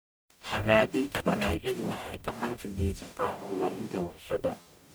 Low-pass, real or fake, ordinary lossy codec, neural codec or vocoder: none; fake; none; codec, 44.1 kHz, 0.9 kbps, DAC